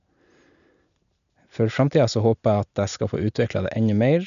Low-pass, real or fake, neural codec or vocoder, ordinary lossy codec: 7.2 kHz; real; none; none